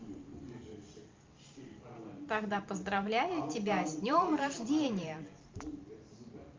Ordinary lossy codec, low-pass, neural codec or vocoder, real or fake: Opus, 24 kbps; 7.2 kHz; vocoder, 44.1 kHz, 128 mel bands every 512 samples, BigVGAN v2; fake